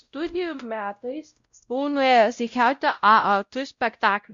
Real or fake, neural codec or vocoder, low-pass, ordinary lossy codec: fake; codec, 16 kHz, 0.5 kbps, X-Codec, WavLM features, trained on Multilingual LibriSpeech; 7.2 kHz; Opus, 64 kbps